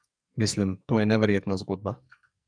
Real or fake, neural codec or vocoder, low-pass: fake; codec, 44.1 kHz, 2.6 kbps, SNAC; 9.9 kHz